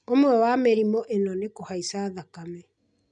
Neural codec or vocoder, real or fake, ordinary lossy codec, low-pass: none; real; none; 9.9 kHz